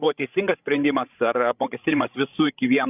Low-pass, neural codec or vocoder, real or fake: 3.6 kHz; codec, 16 kHz, 16 kbps, FreqCodec, larger model; fake